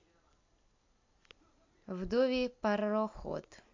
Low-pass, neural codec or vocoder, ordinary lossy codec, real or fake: 7.2 kHz; none; AAC, 48 kbps; real